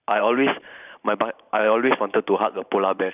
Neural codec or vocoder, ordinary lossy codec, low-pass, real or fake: vocoder, 44.1 kHz, 128 mel bands every 512 samples, BigVGAN v2; none; 3.6 kHz; fake